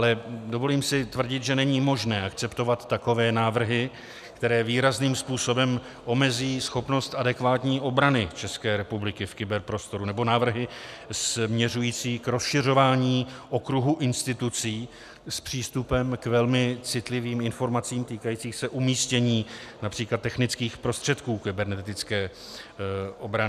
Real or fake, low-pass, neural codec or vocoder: real; 14.4 kHz; none